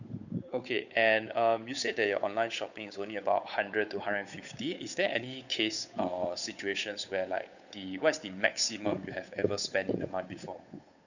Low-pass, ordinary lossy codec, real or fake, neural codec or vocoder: 7.2 kHz; none; fake; codec, 16 kHz, 8 kbps, FunCodec, trained on Chinese and English, 25 frames a second